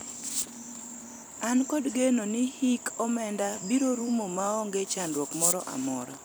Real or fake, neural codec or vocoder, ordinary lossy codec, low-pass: fake; vocoder, 44.1 kHz, 128 mel bands every 256 samples, BigVGAN v2; none; none